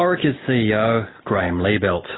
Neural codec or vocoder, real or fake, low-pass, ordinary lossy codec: none; real; 7.2 kHz; AAC, 16 kbps